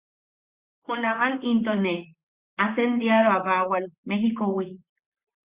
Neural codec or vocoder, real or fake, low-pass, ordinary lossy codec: vocoder, 44.1 kHz, 128 mel bands, Pupu-Vocoder; fake; 3.6 kHz; Opus, 64 kbps